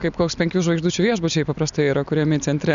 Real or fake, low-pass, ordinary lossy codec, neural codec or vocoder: real; 7.2 kHz; MP3, 96 kbps; none